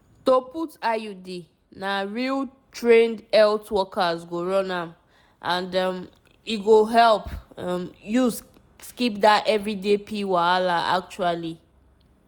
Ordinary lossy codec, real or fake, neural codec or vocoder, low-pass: none; real; none; none